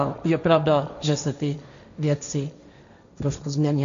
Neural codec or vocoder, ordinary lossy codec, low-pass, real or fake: codec, 16 kHz, 1.1 kbps, Voila-Tokenizer; MP3, 64 kbps; 7.2 kHz; fake